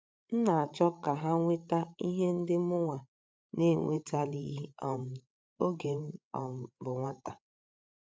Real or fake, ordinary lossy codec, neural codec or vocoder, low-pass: fake; none; codec, 16 kHz, 8 kbps, FreqCodec, larger model; none